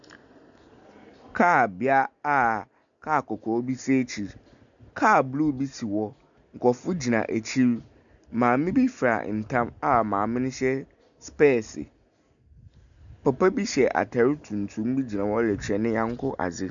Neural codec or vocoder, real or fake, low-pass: none; real; 7.2 kHz